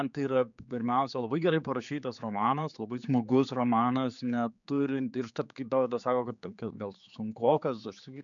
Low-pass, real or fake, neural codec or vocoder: 7.2 kHz; fake; codec, 16 kHz, 4 kbps, X-Codec, HuBERT features, trained on general audio